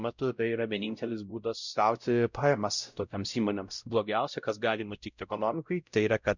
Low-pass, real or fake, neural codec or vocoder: 7.2 kHz; fake; codec, 16 kHz, 0.5 kbps, X-Codec, WavLM features, trained on Multilingual LibriSpeech